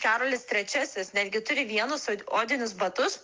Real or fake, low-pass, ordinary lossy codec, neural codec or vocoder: real; 7.2 kHz; Opus, 32 kbps; none